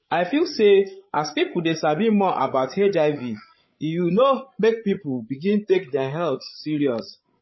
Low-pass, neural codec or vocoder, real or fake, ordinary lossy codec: 7.2 kHz; codec, 16 kHz, 16 kbps, FreqCodec, larger model; fake; MP3, 24 kbps